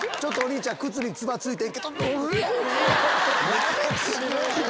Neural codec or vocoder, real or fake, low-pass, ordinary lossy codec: none; real; none; none